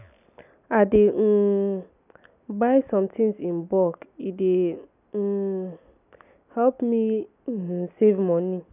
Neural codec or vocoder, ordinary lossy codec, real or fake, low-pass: none; none; real; 3.6 kHz